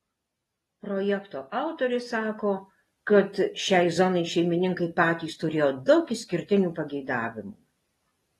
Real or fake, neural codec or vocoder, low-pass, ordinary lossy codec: real; none; 19.8 kHz; AAC, 32 kbps